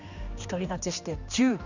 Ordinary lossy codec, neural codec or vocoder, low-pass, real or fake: none; codec, 16 kHz, 2 kbps, X-Codec, HuBERT features, trained on general audio; 7.2 kHz; fake